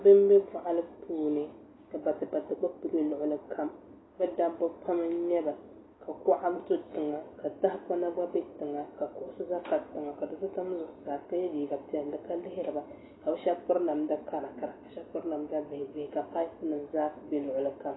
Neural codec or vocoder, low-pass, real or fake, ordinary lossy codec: none; 7.2 kHz; real; AAC, 16 kbps